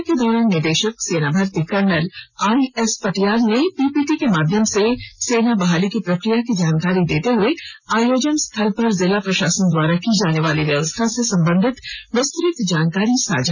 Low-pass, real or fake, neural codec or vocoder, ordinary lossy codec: 7.2 kHz; real; none; none